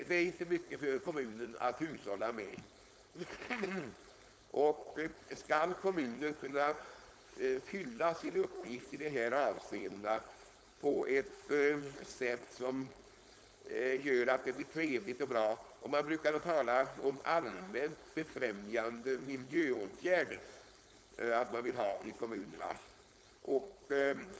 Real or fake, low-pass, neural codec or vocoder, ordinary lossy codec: fake; none; codec, 16 kHz, 4.8 kbps, FACodec; none